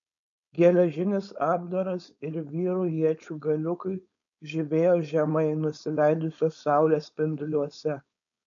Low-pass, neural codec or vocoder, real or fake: 7.2 kHz; codec, 16 kHz, 4.8 kbps, FACodec; fake